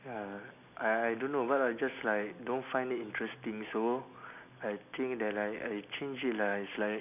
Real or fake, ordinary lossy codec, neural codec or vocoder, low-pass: real; none; none; 3.6 kHz